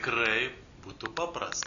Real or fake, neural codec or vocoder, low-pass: real; none; 7.2 kHz